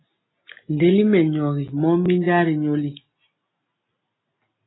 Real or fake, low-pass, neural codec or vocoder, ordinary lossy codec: real; 7.2 kHz; none; AAC, 16 kbps